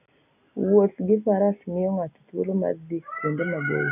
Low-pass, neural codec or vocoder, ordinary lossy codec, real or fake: 3.6 kHz; none; none; real